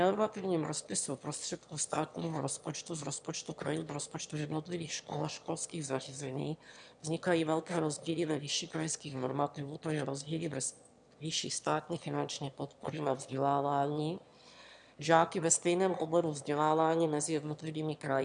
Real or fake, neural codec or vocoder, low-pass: fake; autoencoder, 22.05 kHz, a latent of 192 numbers a frame, VITS, trained on one speaker; 9.9 kHz